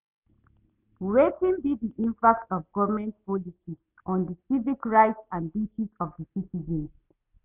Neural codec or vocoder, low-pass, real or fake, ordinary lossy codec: none; 3.6 kHz; real; none